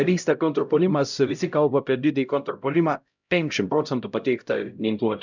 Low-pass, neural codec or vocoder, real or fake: 7.2 kHz; codec, 16 kHz, 0.5 kbps, X-Codec, HuBERT features, trained on LibriSpeech; fake